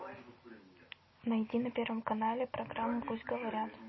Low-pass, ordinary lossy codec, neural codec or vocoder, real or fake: 7.2 kHz; MP3, 24 kbps; none; real